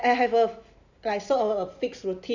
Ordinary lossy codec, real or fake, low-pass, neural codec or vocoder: none; real; 7.2 kHz; none